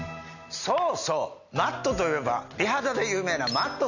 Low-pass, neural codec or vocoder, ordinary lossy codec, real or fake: 7.2 kHz; none; none; real